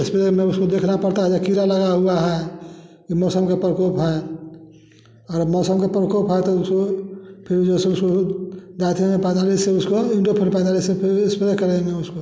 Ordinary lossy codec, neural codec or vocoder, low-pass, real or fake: none; none; none; real